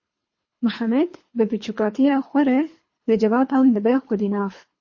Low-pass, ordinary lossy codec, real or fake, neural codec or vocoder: 7.2 kHz; MP3, 32 kbps; fake; codec, 24 kHz, 3 kbps, HILCodec